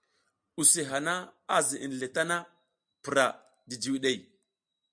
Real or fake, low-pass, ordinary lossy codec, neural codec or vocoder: real; 9.9 kHz; MP3, 64 kbps; none